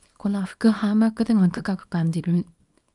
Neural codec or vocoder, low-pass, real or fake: codec, 24 kHz, 0.9 kbps, WavTokenizer, small release; 10.8 kHz; fake